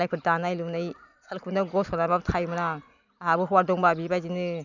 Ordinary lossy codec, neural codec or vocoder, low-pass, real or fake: none; none; 7.2 kHz; real